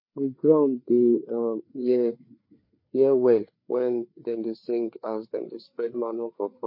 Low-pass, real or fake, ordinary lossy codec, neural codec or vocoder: 5.4 kHz; fake; MP3, 32 kbps; codec, 16 kHz, 4 kbps, FreqCodec, larger model